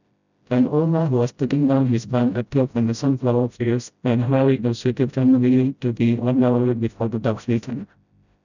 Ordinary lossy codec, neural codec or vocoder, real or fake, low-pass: none; codec, 16 kHz, 0.5 kbps, FreqCodec, smaller model; fake; 7.2 kHz